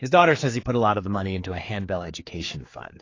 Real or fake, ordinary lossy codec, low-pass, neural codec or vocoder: fake; AAC, 32 kbps; 7.2 kHz; codec, 16 kHz, 4 kbps, X-Codec, HuBERT features, trained on general audio